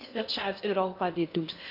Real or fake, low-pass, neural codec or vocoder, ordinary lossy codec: fake; 5.4 kHz; codec, 16 kHz in and 24 kHz out, 0.8 kbps, FocalCodec, streaming, 65536 codes; none